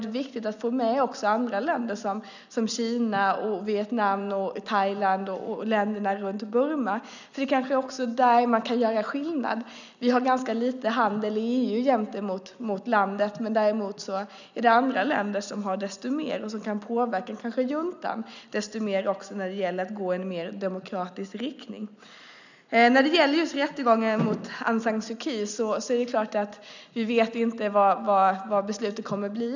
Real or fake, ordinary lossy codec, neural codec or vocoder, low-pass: real; none; none; 7.2 kHz